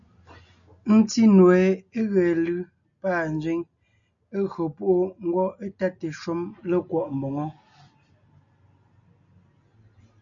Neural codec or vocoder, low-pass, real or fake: none; 7.2 kHz; real